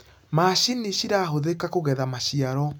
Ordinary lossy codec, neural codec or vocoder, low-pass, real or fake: none; none; none; real